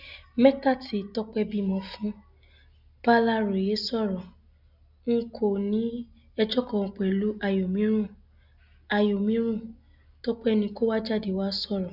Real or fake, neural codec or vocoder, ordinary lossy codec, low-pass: real; none; none; 5.4 kHz